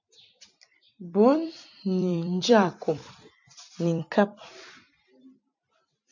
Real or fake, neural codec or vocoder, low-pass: fake; vocoder, 44.1 kHz, 128 mel bands every 256 samples, BigVGAN v2; 7.2 kHz